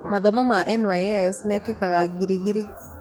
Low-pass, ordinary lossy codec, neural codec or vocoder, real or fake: none; none; codec, 44.1 kHz, 2.6 kbps, DAC; fake